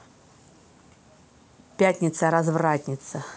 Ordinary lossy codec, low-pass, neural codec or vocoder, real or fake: none; none; none; real